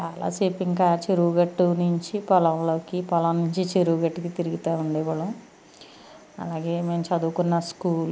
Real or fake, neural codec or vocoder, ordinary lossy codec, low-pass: real; none; none; none